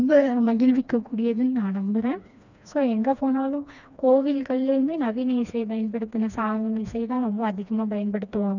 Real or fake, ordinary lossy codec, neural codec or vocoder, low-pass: fake; none; codec, 16 kHz, 2 kbps, FreqCodec, smaller model; 7.2 kHz